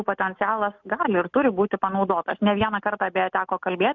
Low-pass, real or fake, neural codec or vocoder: 7.2 kHz; real; none